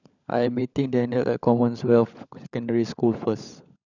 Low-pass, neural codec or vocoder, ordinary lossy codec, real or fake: 7.2 kHz; codec, 16 kHz, 16 kbps, FunCodec, trained on LibriTTS, 50 frames a second; none; fake